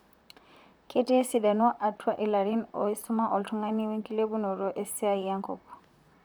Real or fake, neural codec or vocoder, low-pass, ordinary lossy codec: fake; vocoder, 44.1 kHz, 128 mel bands, Pupu-Vocoder; none; none